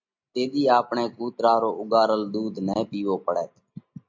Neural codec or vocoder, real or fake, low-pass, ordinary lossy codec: none; real; 7.2 kHz; MP3, 48 kbps